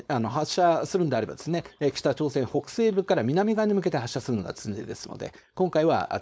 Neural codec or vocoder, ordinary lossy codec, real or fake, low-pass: codec, 16 kHz, 4.8 kbps, FACodec; none; fake; none